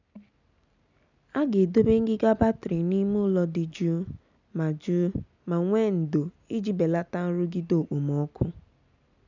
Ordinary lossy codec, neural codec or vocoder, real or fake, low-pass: none; none; real; 7.2 kHz